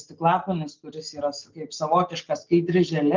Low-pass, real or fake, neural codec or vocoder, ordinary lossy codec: 7.2 kHz; real; none; Opus, 32 kbps